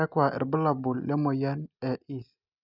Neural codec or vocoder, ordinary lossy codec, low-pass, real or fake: none; none; 5.4 kHz; real